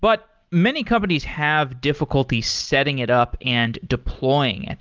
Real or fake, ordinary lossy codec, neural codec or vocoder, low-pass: real; Opus, 24 kbps; none; 7.2 kHz